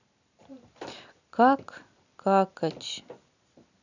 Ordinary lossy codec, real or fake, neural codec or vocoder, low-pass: none; real; none; 7.2 kHz